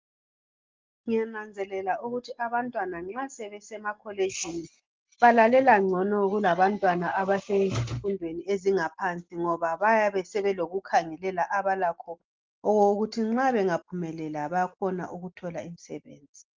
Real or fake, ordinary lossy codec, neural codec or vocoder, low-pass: real; Opus, 32 kbps; none; 7.2 kHz